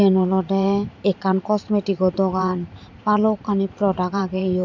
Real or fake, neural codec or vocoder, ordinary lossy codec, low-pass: fake; vocoder, 44.1 kHz, 128 mel bands every 512 samples, BigVGAN v2; none; 7.2 kHz